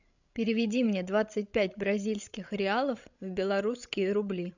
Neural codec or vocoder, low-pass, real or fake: codec, 16 kHz, 16 kbps, FreqCodec, larger model; 7.2 kHz; fake